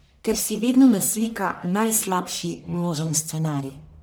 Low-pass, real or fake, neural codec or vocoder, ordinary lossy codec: none; fake; codec, 44.1 kHz, 1.7 kbps, Pupu-Codec; none